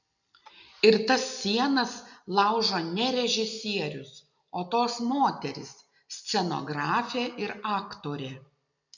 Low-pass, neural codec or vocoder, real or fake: 7.2 kHz; none; real